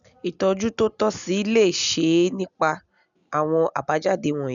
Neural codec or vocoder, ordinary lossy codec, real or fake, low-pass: none; none; real; 7.2 kHz